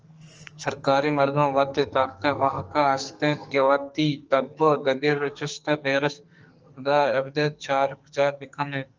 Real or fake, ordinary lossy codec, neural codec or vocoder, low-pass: fake; Opus, 24 kbps; codec, 44.1 kHz, 2.6 kbps, SNAC; 7.2 kHz